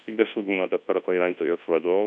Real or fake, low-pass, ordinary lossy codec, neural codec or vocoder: fake; 9.9 kHz; MP3, 64 kbps; codec, 24 kHz, 0.9 kbps, WavTokenizer, large speech release